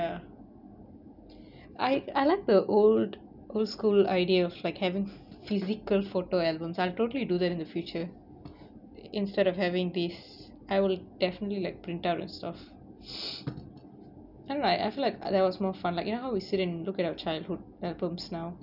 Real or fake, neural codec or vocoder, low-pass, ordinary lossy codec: real; none; 5.4 kHz; none